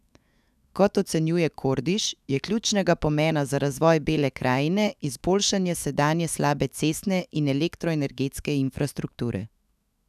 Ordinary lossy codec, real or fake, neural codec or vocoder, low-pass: none; fake; autoencoder, 48 kHz, 128 numbers a frame, DAC-VAE, trained on Japanese speech; 14.4 kHz